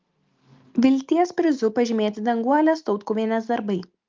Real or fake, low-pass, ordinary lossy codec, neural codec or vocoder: real; 7.2 kHz; Opus, 24 kbps; none